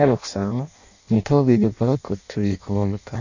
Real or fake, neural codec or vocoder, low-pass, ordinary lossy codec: fake; codec, 16 kHz in and 24 kHz out, 0.6 kbps, FireRedTTS-2 codec; 7.2 kHz; none